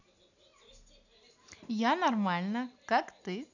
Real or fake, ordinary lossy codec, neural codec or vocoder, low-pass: real; none; none; 7.2 kHz